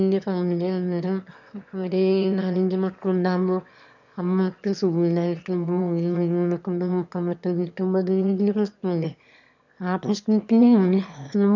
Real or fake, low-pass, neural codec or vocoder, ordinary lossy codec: fake; 7.2 kHz; autoencoder, 22.05 kHz, a latent of 192 numbers a frame, VITS, trained on one speaker; none